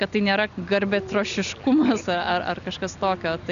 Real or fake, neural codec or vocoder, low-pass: real; none; 7.2 kHz